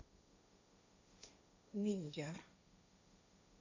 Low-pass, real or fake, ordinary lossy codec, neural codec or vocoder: 7.2 kHz; fake; AAC, 48 kbps; codec, 16 kHz, 1.1 kbps, Voila-Tokenizer